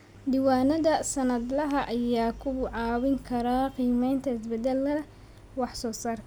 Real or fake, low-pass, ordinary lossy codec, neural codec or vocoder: real; none; none; none